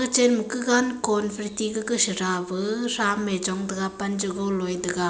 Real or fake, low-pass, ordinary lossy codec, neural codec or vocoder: real; none; none; none